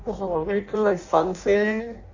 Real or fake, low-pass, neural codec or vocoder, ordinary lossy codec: fake; 7.2 kHz; codec, 16 kHz in and 24 kHz out, 0.6 kbps, FireRedTTS-2 codec; Opus, 64 kbps